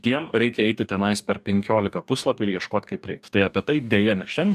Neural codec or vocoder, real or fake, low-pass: codec, 44.1 kHz, 2.6 kbps, DAC; fake; 14.4 kHz